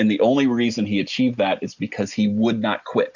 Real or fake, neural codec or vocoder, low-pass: real; none; 7.2 kHz